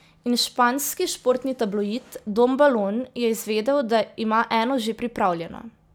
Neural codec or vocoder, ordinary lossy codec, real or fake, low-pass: none; none; real; none